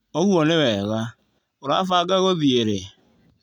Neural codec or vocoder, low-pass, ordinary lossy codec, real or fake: none; 19.8 kHz; none; real